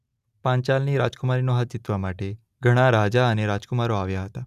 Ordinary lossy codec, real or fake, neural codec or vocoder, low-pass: none; real; none; 14.4 kHz